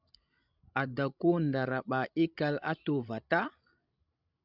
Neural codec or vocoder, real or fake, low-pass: codec, 16 kHz, 16 kbps, FreqCodec, larger model; fake; 5.4 kHz